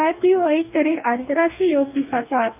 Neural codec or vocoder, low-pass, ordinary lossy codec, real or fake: codec, 44.1 kHz, 2.6 kbps, DAC; 3.6 kHz; none; fake